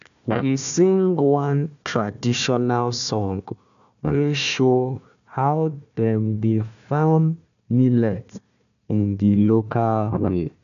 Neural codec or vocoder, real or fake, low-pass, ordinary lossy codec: codec, 16 kHz, 1 kbps, FunCodec, trained on Chinese and English, 50 frames a second; fake; 7.2 kHz; none